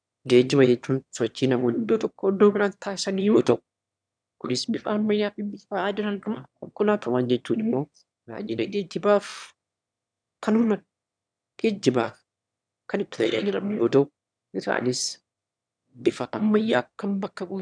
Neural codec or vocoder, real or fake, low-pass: autoencoder, 22.05 kHz, a latent of 192 numbers a frame, VITS, trained on one speaker; fake; 9.9 kHz